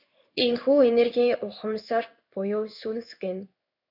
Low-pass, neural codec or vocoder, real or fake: 5.4 kHz; codec, 16 kHz in and 24 kHz out, 1 kbps, XY-Tokenizer; fake